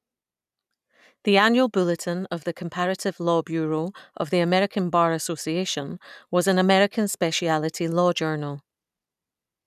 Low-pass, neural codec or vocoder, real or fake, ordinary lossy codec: 14.4 kHz; none; real; none